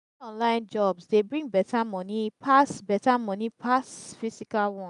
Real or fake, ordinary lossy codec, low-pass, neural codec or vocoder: real; none; 10.8 kHz; none